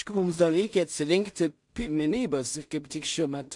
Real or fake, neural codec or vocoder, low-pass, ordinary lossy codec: fake; codec, 16 kHz in and 24 kHz out, 0.4 kbps, LongCat-Audio-Codec, two codebook decoder; 10.8 kHz; MP3, 64 kbps